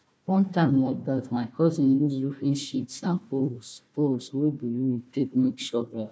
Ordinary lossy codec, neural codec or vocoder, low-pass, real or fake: none; codec, 16 kHz, 1 kbps, FunCodec, trained on Chinese and English, 50 frames a second; none; fake